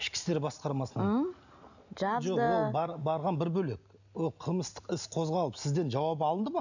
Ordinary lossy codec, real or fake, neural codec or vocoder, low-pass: none; real; none; 7.2 kHz